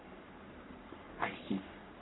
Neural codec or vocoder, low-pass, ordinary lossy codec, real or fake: codec, 44.1 kHz, 3.4 kbps, Pupu-Codec; 7.2 kHz; AAC, 16 kbps; fake